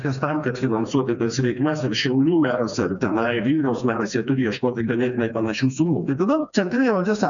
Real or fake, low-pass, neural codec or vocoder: fake; 7.2 kHz; codec, 16 kHz, 2 kbps, FreqCodec, smaller model